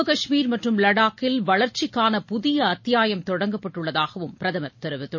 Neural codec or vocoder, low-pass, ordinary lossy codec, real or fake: none; 7.2 kHz; MP3, 32 kbps; real